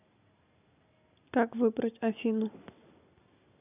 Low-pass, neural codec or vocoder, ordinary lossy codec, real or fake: 3.6 kHz; none; none; real